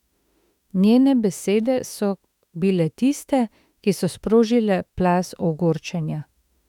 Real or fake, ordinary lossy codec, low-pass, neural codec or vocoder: fake; none; 19.8 kHz; autoencoder, 48 kHz, 32 numbers a frame, DAC-VAE, trained on Japanese speech